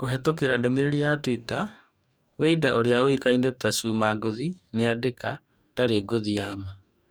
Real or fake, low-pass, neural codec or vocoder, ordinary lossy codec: fake; none; codec, 44.1 kHz, 2.6 kbps, DAC; none